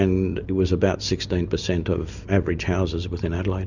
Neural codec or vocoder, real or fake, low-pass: none; real; 7.2 kHz